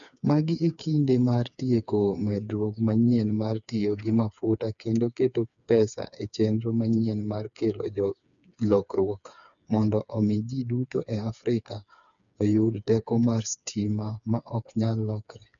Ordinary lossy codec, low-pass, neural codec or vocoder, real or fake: none; 7.2 kHz; codec, 16 kHz, 4 kbps, FreqCodec, smaller model; fake